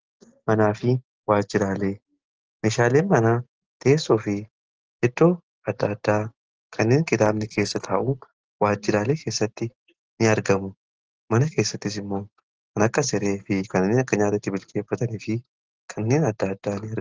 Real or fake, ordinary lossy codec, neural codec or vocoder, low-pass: real; Opus, 16 kbps; none; 7.2 kHz